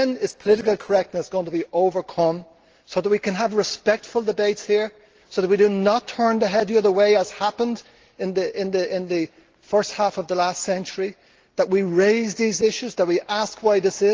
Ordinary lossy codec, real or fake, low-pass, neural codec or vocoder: Opus, 16 kbps; real; 7.2 kHz; none